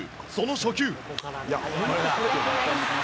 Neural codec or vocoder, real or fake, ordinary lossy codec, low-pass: none; real; none; none